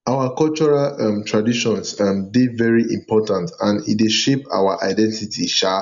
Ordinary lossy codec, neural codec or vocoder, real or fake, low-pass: none; none; real; 7.2 kHz